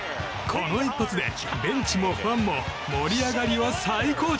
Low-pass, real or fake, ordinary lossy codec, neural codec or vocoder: none; real; none; none